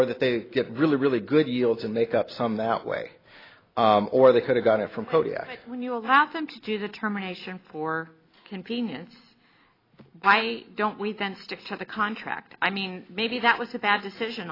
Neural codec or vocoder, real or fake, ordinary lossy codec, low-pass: none; real; AAC, 24 kbps; 5.4 kHz